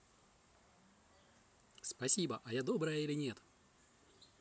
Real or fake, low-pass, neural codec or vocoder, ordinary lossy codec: real; none; none; none